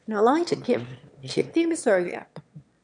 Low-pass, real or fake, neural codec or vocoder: 9.9 kHz; fake; autoencoder, 22.05 kHz, a latent of 192 numbers a frame, VITS, trained on one speaker